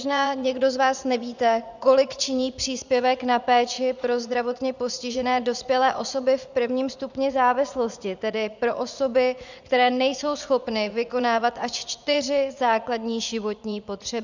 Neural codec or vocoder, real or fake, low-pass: vocoder, 44.1 kHz, 80 mel bands, Vocos; fake; 7.2 kHz